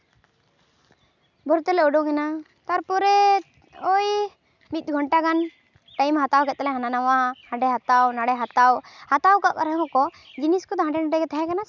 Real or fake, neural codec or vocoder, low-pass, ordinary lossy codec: real; none; 7.2 kHz; none